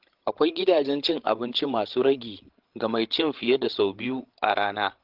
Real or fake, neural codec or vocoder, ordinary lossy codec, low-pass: fake; codec, 16 kHz, 16 kbps, FreqCodec, larger model; Opus, 16 kbps; 5.4 kHz